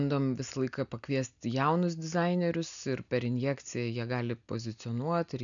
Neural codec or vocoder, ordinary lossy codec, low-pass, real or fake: none; MP3, 96 kbps; 7.2 kHz; real